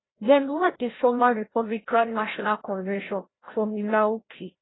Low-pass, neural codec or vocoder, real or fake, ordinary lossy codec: 7.2 kHz; codec, 16 kHz, 0.5 kbps, FreqCodec, larger model; fake; AAC, 16 kbps